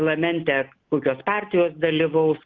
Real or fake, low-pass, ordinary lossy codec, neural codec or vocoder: real; 7.2 kHz; Opus, 32 kbps; none